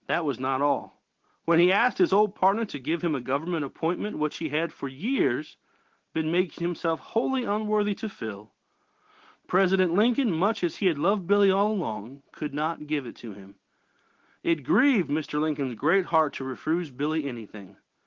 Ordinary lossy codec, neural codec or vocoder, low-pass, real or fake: Opus, 16 kbps; none; 7.2 kHz; real